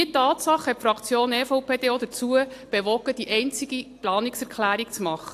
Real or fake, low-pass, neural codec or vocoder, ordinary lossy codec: real; 14.4 kHz; none; AAC, 64 kbps